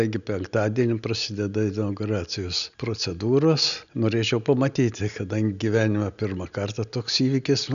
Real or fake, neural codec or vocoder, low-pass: real; none; 7.2 kHz